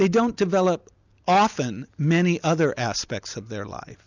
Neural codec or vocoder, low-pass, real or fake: none; 7.2 kHz; real